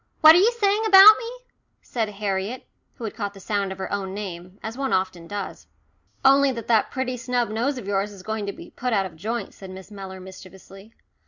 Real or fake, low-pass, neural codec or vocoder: real; 7.2 kHz; none